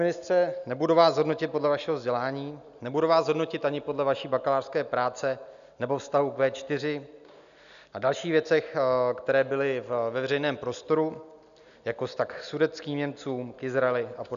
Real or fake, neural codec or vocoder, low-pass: real; none; 7.2 kHz